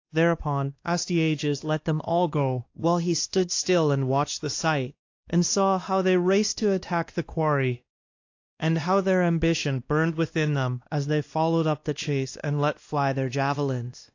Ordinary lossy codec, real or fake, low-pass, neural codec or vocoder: AAC, 48 kbps; fake; 7.2 kHz; codec, 16 kHz, 1 kbps, X-Codec, WavLM features, trained on Multilingual LibriSpeech